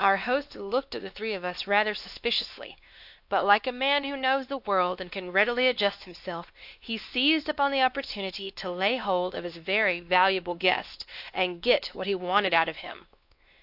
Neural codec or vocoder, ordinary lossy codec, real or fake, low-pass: codec, 16 kHz, 2 kbps, X-Codec, WavLM features, trained on Multilingual LibriSpeech; AAC, 48 kbps; fake; 5.4 kHz